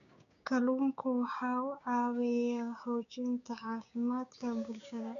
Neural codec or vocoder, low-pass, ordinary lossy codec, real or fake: codec, 16 kHz, 6 kbps, DAC; 7.2 kHz; none; fake